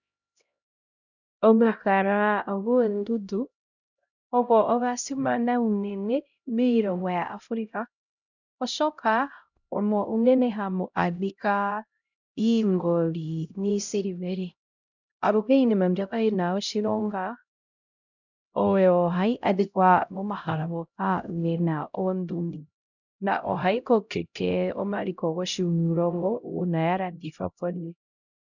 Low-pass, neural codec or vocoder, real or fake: 7.2 kHz; codec, 16 kHz, 0.5 kbps, X-Codec, HuBERT features, trained on LibriSpeech; fake